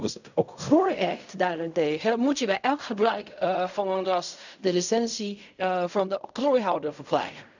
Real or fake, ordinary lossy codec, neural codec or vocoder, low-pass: fake; none; codec, 16 kHz in and 24 kHz out, 0.4 kbps, LongCat-Audio-Codec, fine tuned four codebook decoder; 7.2 kHz